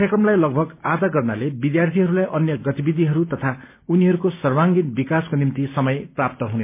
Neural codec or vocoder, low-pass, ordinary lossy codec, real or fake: none; 3.6 kHz; MP3, 32 kbps; real